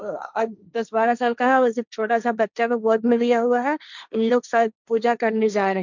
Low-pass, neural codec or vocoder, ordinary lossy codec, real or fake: 7.2 kHz; codec, 16 kHz, 1.1 kbps, Voila-Tokenizer; none; fake